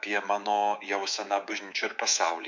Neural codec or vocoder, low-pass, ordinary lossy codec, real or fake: codec, 24 kHz, 3.1 kbps, DualCodec; 7.2 kHz; MP3, 64 kbps; fake